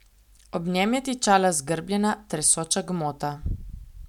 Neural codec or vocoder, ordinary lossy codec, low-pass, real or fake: none; none; 19.8 kHz; real